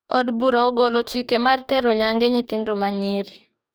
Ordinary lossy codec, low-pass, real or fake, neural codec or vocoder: none; none; fake; codec, 44.1 kHz, 2.6 kbps, DAC